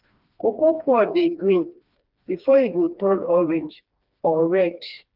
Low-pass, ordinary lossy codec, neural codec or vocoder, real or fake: 5.4 kHz; Opus, 24 kbps; codec, 16 kHz, 2 kbps, FreqCodec, smaller model; fake